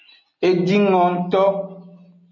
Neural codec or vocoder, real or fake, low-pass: none; real; 7.2 kHz